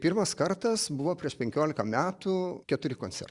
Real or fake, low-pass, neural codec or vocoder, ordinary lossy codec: fake; 10.8 kHz; vocoder, 44.1 kHz, 128 mel bands every 512 samples, BigVGAN v2; Opus, 64 kbps